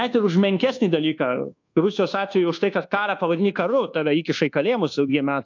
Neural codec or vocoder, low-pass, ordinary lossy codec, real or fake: codec, 24 kHz, 1.2 kbps, DualCodec; 7.2 kHz; AAC, 48 kbps; fake